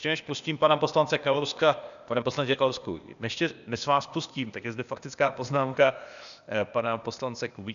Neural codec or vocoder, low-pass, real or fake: codec, 16 kHz, 0.8 kbps, ZipCodec; 7.2 kHz; fake